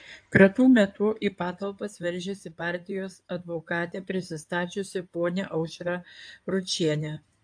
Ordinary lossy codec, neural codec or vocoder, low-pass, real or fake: AAC, 64 kbps; codec, 16 kHz in and 24 kHz out, 2.2 kbps, FireRedTTS-2 codec; 9.9 kHz; fake